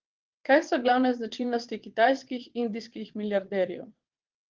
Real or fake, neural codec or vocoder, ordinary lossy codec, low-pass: fake; vocoder, 22.05 kHz, 80 mel bands, WaveNeXt; Opus, 16 kbps; 7.2 kHz